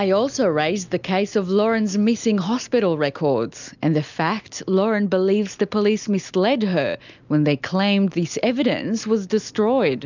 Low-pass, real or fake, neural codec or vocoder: 7.2 kHz; real; none